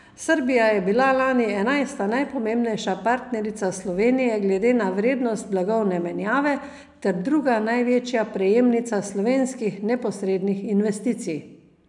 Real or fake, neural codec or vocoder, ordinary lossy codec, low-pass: real; none; none; 10.8 kHz